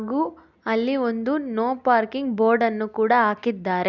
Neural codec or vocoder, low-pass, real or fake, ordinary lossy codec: none; 7.2 kHz; real; none